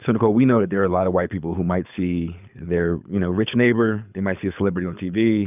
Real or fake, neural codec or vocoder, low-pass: fake; codec, 24 kHz, 6 kbps, HILCodec; 3.6 kHz